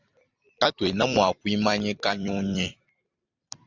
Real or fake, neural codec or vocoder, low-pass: fake; vocoder, 44.1 kHz, 128 mel bands every 256 samples, BigVGAN v2; 7.2 kHz